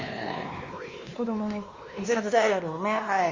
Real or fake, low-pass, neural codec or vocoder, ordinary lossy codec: fake; 7.2 kHz; codec, 16 kHz, 2 kbps, X-Codec, WavLM features, trained on Multilingual LibriSpeech; Opus, 32 kbps